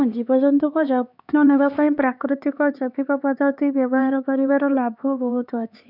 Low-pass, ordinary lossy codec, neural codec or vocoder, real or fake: 5.4 kHz; none; codec, 16 kHz, 4 kbps, X-Codec, HuBERT features, trained on LibriSpeech; fake